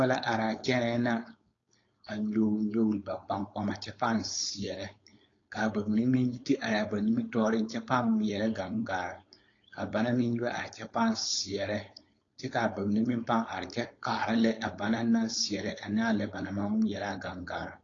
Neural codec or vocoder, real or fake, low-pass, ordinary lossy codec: codec, 16 kHz, 4.8 kbps, FACodec; fake; 7.2 kHz; AAC, 32 kbps